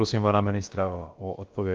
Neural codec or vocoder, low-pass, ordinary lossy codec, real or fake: codec, 16 kHz, about 1 kbps, DyCAST, with the encoder's durations; 7.2 kHz; Opus, 24 kbps; fake